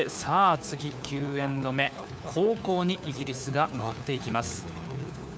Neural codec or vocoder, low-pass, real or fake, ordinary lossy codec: codec, 16 kHz, 4 kbps, FunCodec, trained on LibriTTS, 50 frames a second; none; fake; none